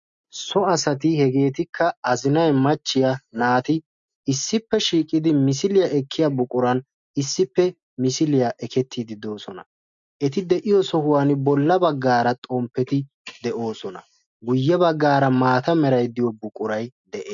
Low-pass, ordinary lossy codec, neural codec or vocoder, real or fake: 7.2 kHz; MP3, 64 kbps; none; real